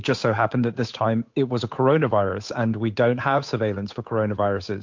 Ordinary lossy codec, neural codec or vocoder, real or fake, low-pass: MP3, 48 kbps; codec, 16 kHz, 8 kbps, FunCodec, trained on Chinese and English, 25 frames a second; fake; 7.2 kHz